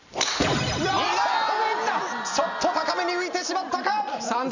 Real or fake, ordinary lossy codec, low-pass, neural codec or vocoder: real; none; 7.2 kHz; none